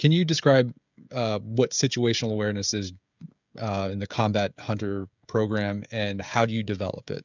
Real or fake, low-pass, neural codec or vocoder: fake; 7.2 kHz; codec, 16 kHz, 16 kbps, FreqCodec, smaller model